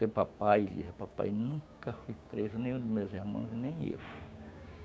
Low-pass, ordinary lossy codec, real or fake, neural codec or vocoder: none; none; fake; codec, 16 kHz, 6 kbps, DAC